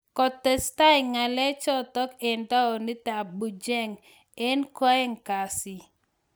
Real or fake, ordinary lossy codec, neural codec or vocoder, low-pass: real; none; none; none